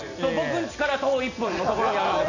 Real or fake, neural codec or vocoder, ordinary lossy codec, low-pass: real; none; none; 7.2 kHz